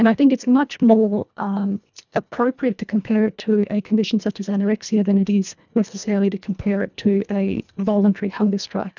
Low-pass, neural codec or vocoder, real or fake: 7.2 kHz; codec, 24 kHz, 1.5 kbps, HILCodec; fake